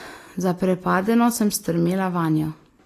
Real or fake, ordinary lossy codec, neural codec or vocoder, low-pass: real; AAC, 48 kbps; none; 14.4 kHz